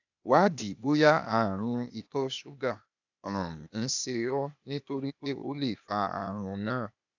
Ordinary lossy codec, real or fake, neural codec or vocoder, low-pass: none; fake; codec, 16 kHz, 0.8 kbps, ZipCodec; 7.2 kHz